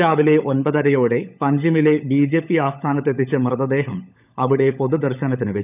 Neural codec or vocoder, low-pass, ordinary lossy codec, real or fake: codec, 16 kHz, 16 kbps, FunCodec, trained on LibriTTS, 50 frames a second; 3.6 kHz; none; fake